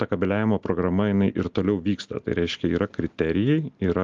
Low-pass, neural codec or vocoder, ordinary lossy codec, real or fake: 7.2 kHz; none; Opus, 32 kbps; real